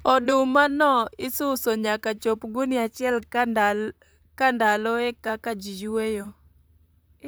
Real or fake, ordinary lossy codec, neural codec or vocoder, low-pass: fake; none; vocoder, 44.1 kHz, 128 mel bands, Pupu-Vocoder; none